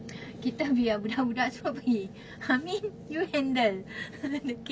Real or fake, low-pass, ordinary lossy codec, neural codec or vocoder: real; none; none; none